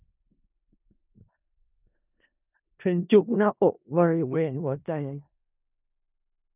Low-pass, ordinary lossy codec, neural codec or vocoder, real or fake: 3.6 kHz; none; codec, 16 kHz in and 24 kHz out, 0.4 kbps, LongCat-Audio-Codec, four codebook decoder; fake